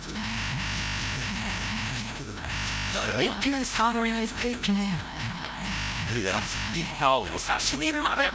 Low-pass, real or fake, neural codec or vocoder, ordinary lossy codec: none; fake; codec, 16 kHz, 0.5 kbps, FreqCodec, larger model; none